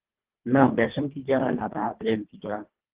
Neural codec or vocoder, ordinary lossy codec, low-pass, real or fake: codec, 24 kHz, 1.5 kbps, HILCodec; Opus, 16 kbps; 3.6 kHz; fake